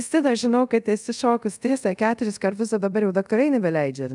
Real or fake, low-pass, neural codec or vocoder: fake; 10.8 kHz; codec, 24 kHz, 0.5 kbps, DualCodec